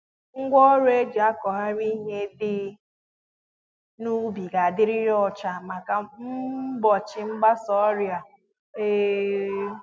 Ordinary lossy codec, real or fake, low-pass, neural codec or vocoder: none; real; none; none